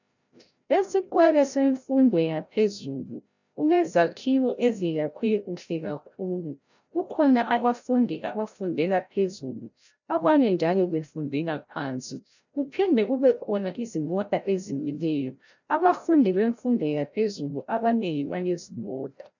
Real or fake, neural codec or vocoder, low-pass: fake; codec, 16 kHz, 0.5 kbps, FreqCodec, larger model; 7.2 kHz